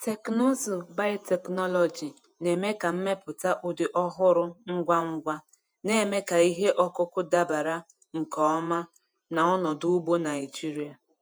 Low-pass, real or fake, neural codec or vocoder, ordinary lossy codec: none; fake; vocoder, 48 kHz, 128 mel bands, Vocos; none